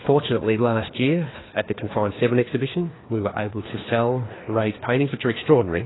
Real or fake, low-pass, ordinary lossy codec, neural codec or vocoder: fake; 7.2 kHz; AAC, 16 kbps; codec, 16 kHz, 2 kbps, FreqCodec, larger model